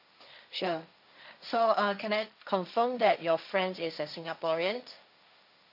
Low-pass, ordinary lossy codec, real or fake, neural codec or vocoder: 5.4 kHz; none; fake; codec, 16 kHz, 1.1 kbps, Voila-Tokenizer